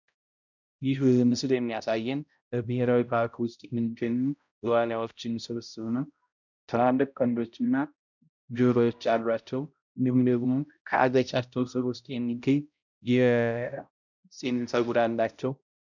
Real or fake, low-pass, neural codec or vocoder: fake; 7.2 kHz; codec, 16 kHz, 0.5 kbps, X-Codec, HuBERT features, trained on balanced general audio